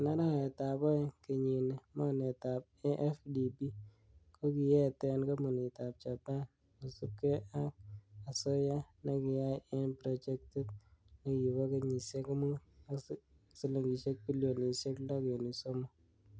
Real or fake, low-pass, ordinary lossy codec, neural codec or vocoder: real; none; none; none